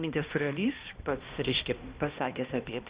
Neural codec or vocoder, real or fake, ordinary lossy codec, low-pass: codec, 16 kHz, 1 kbps, X-Codec, HuBERT features, trained on balanced general audio; fake; Opus, 64 kbps; 3.6 kHz